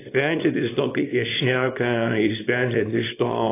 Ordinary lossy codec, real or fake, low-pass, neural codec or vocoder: AAC, 24 kbps; fake; 3.6 kHz; codec, 24 kHz, 0.9 kbps, WavTokenizer, small release